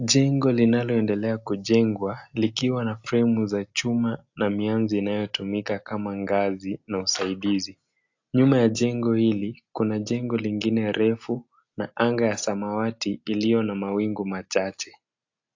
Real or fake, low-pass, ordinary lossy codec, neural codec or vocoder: real; 7.2 kHz; AAC, 48 kbps; none